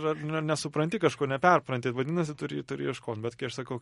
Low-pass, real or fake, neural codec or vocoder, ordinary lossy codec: 19.8 kHz; real; none; MP3, 48 kbps